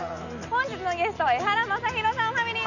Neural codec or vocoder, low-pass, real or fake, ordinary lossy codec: none; 7.2 kHz; real; none